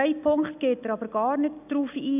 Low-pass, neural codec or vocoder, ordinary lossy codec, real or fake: 3.6 kHz; none; none; real